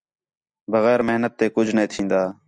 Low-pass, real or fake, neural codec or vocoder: 9.9 kHz; real; none